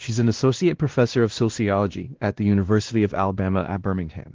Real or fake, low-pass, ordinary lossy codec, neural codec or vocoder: fake; 7.2 kHz; Opus, 16 kbps; codec, 16 kHz, 1 kbps, X-Codec, WavLM features, trained on Multilingual LibriSpeech